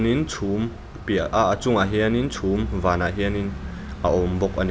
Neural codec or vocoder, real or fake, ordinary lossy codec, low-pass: none; real; none; none